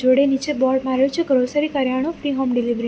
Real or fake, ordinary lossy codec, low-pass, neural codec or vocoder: real; none; none; none